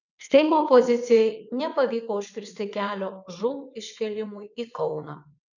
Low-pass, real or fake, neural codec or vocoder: 7.2 kHz; fake; vocoder, 22.05 kHz, 80 mel bands, WaveNeXt